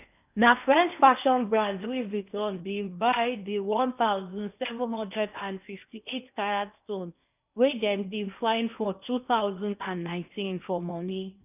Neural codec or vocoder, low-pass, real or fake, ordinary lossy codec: codec, 16 kHz in and 24 kHz out, 0.8 kbps, FocalCodec, streaming, 65536 codes; 3.6 kHz; fake; none